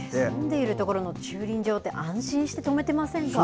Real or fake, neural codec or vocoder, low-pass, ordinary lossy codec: real; none; none; none